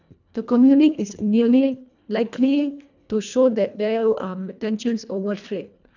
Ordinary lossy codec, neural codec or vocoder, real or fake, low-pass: none; codec, 24 kHz, 1.5 kbps, HILCodec; fake; 7.2 kHz